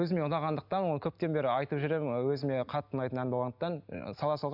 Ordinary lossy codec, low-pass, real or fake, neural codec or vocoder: none; 5.4 kHz; real; none